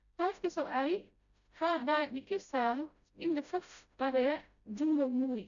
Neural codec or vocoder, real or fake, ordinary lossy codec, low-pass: codec, 16 kHz, 0.5 kbps, FreqCodec, smaller model; fake; none; 7.2 kHz